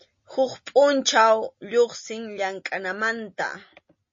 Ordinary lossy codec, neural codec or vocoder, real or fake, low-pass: MP3, 32 kbps; none; real; 7.2 kHz